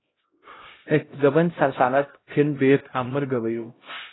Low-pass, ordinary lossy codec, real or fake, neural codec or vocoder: 7.2 kHz; AAC, 16 kbps; fake; codec, 16 kHz, 0.5 kbps, X-Codec, HuBERT features, trained on LibriSpeech